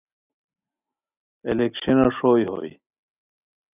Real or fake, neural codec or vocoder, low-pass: real; none; 3.6 kHz